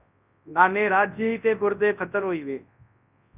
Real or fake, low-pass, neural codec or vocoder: fake; 3.6 kHz; codec, 24 kHz, 0.9 kbps, WavTokenizer, large speech release